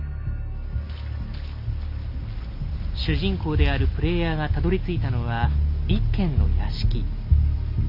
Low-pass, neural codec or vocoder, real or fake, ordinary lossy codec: 5.4 kHz; none; real; none